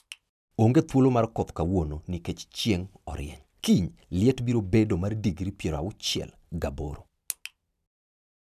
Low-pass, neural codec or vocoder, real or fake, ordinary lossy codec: 14.4 kHz; none; real; none